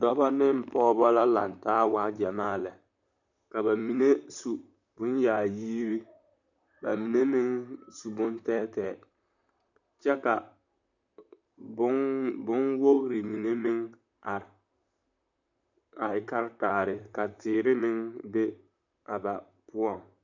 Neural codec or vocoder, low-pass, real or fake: vocoder, 44.1 kHz, 128 mel bands, Pupu-Vocoder; 7.2 kHz; fake